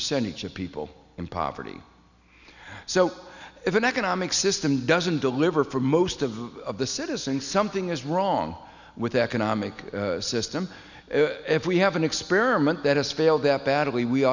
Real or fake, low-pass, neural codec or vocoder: real; 7.2 kHz; none